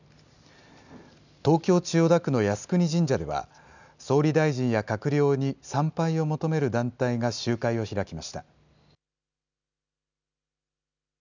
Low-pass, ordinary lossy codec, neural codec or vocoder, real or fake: 7.2 kHz; none; none; real